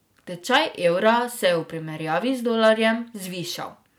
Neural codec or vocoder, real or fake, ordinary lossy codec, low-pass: none; real; none; none